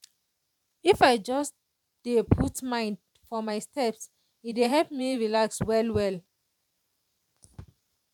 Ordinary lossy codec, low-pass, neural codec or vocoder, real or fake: none; 19.8 kHz; vocoder, 44.1 kHz, 128 mel bands every 512 samples, BigVGAN v2; fake